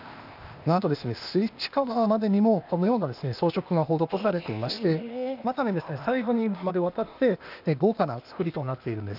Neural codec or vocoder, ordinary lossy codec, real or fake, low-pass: codec, 16 kHz, 0.8 kbps, ZipCodec; none; fake; 5.4 kHz